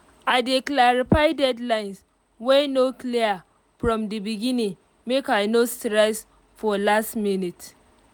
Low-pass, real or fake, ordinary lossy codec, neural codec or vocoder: none; real; none; none